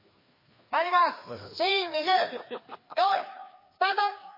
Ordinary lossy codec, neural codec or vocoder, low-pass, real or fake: MP3, 24 kbps; codec, 16 kHz, 2 kbps, FreqCodec, larger model; 5.4 kHz; fake